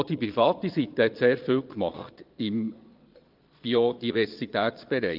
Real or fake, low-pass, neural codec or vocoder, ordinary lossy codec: fake; 5.4 kHz; vocoder, 22.05 kHz, 80 mel bands, Vocos; Opus, 32 kbps